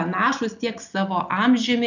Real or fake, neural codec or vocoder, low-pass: real; none; 7.2 kHz